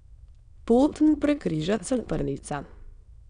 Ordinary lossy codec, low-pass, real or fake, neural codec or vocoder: none; 9.9 kHz; fake; autoencoder, 22.05 kHz, a latent of 192 numbers a frame, VITS, trained on many speakers